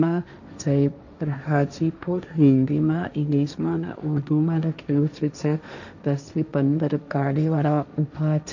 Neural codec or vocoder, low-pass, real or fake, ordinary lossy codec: codec, 16 kHz, 1.1 kbps, Voila-Tokenizer; 7.2 kHz; fake; none